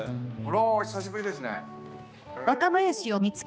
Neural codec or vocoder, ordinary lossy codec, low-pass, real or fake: codec, 16 kHz, 2 kbps, X-Codec, HuBERT features, trained on balanced general audio; none; none; fake